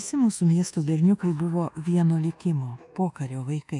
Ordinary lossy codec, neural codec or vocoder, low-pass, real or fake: MP3, 96 kbps; codec, 24 kHz, 1.2 kbps, DualCodec; 10.8 kHz; fake